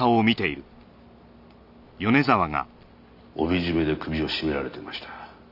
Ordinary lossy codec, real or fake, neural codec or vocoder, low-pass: none; real; none; 5.4 kHz